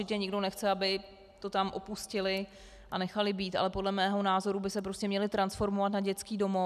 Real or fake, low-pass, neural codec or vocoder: real; 14.4 kHz; none